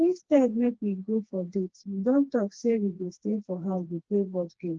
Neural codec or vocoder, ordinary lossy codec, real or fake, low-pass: codec, 16 kHz, 2 kbps, FreqCodec, smaller model; Opus, 16 kbps; fake; 7.2 kHz